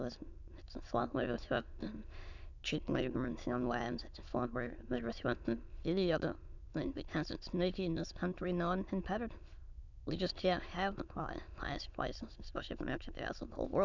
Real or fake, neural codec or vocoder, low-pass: fake; autoencoder, 22.05 kHz, a latent of 192 numbers a frame, VITS, trained on many speakers; 7.2 kHz